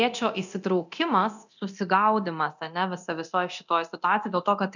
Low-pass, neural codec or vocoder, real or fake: 7.2 kHz; codec, 24 kHz, 0.9 kbps, DualCodec; fake